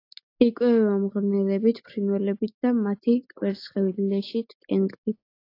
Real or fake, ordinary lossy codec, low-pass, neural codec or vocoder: real; AAC, 32 kbps; 5.4 kHz; none